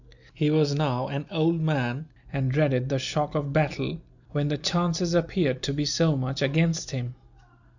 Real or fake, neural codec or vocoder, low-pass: real; none; 7.2 kHz